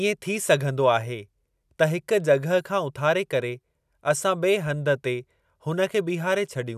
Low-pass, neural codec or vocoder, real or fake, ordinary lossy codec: 14.4 kHz; none; real; none